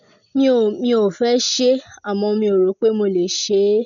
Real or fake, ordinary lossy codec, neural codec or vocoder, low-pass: real; none; none; 7.2 kHz